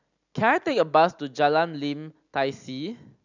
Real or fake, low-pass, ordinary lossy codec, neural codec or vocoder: real; 7.2 kHz; none; none